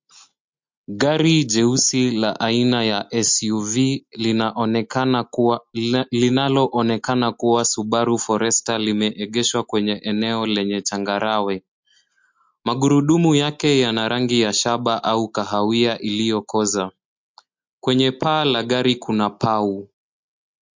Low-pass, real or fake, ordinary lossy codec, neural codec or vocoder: 7.2 kHz; real; MP3, 48 kbps; none